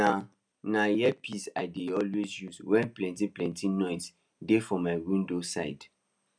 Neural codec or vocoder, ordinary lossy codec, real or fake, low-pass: none; none; real; 9.9 kHz